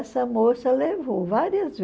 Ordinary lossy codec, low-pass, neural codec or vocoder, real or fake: none; none; none; real